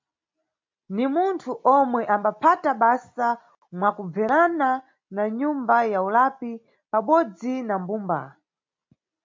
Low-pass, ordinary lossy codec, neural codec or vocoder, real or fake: 7.2 kHz; MP3, 48 kbps; none; real